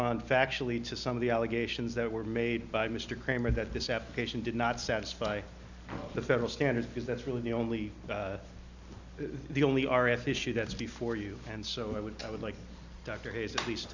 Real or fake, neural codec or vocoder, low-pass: real; none; 7.2 kHz